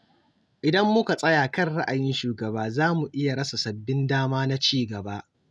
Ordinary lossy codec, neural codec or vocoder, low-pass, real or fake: none; none; none; real